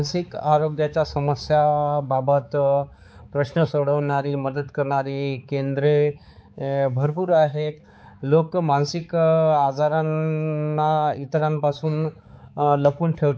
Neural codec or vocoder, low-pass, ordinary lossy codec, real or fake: codec, 16 kHz, 4 kbps, X-Codec, HuBERT features, trained on balanced general audio; none; none; fake